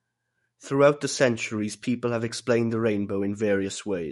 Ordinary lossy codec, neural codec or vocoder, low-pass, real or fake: MP3, 48 kbps; autoencoder, 48 kHz, 128 numbers a frame, DAC-VAE, trained on Japanese speech; 19.8 kHz; fake